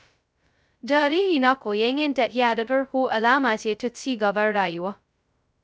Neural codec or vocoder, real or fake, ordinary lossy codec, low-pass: codec, 16 kHz, 0.2 kbps, FocalCodec; fake; none; none